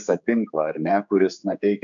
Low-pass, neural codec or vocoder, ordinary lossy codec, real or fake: 7.2 kHz; codec, 16 kHz, 4 kbps, X-Codec, HuBERT features, trained on general audio; MP3, 64 kbps; fake